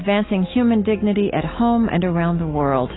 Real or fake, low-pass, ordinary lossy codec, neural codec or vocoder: fake; 7.2 kHz; AAC, 16 kbps; autoencoder, 48 kHz, 128 numbers a frame, DAC-VAE, trained on Japanese speech